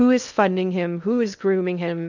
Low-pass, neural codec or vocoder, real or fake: 7.2 kHz; codec, 16 kHz in and 24 kHz out, 0.6 kbps, FocalCodec, streaming, 2048 codes; fake